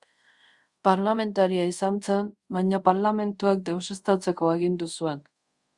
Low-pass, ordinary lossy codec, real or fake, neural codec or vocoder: 10.8 kHz; Opus, 64 kbps; fake; codec, 24 kHz, 0.5 kbps, DualCodec